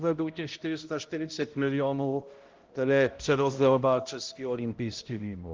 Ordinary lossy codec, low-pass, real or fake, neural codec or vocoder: Opus, 16 kbps; 7.2 kHz; fake; codec, 16 kHz, 0.5 kbps, X-Codec, HuBERT features, trained on balanced general audio